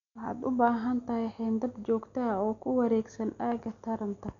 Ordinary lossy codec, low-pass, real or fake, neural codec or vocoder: none; 7.2 kHz; real; none